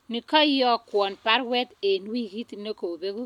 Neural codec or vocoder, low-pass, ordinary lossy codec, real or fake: none; 19.8 kHz; none; real